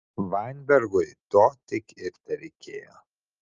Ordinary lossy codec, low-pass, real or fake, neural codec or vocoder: Opus, 24 kbps; 7.2 kHz; real; none